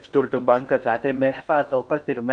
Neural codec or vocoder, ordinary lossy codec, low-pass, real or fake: codec, 16 kHz in and 24 kHz out, 0.8 kbps, FocalCodec, streaming, 65536 codes; MP3, 96 kbps; 9.9 kHz; fake